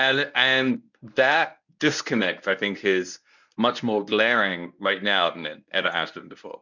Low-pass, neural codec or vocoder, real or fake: 7.2 kHz; codec, 24 kHz, 0.9 kbps, WavTokenizer, medium speech release version 1; fake